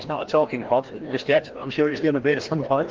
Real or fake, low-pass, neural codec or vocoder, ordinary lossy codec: fake; 7.2 kHz; codec, 16 kHz, 1 kbps, FreqCodec, larger model; Opus, 16 kbps